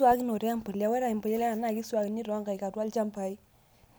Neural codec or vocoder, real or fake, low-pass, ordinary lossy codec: none; real; none; none